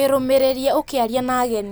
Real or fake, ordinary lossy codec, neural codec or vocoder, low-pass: real; none; none; none